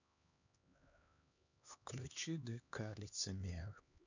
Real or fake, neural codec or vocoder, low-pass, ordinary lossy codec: fake; codec, 16 kHz, 2 kbps, X-Codec, HuBERT features, trained on LibriSpeech; 7.2 kHz; none